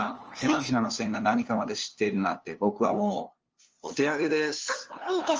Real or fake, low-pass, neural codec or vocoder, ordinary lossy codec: fake; 7.2 kHz; codec, 16 kHz, 2 kbps, FunCodec, trained on LibriTTS, 25 frames a second; Opus, 24 kbps